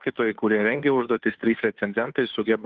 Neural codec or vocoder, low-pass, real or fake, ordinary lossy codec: codec, 16 kHz, 2 kbps, FunCodec, trained on Chinese and English, 25 frames a second; 7.2 kHz; fake; Opus, 24 kbps